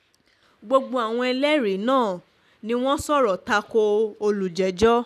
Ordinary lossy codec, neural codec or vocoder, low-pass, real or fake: none; none; 14.4 kHz; real